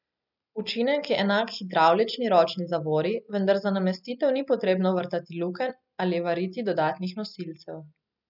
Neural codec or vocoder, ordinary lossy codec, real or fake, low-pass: none; none; real; 5.4 kHz